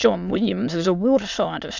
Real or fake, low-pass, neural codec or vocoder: fake; 7.2 kHz; autoencoder, 22.05 kHz, a latent of 192 numbers a frame, VITS, trained on many speakers